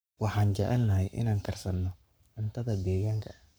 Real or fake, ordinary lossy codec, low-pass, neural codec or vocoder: fake; none; none; codec, 44.1 kHz, 7.8 kbps, Pupu-Codec